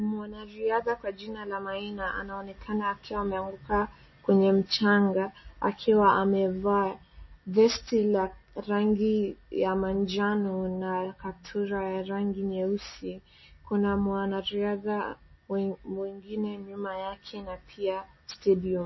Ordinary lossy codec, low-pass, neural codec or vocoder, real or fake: MP3, 24 kbps; 7.2 kHz; none; real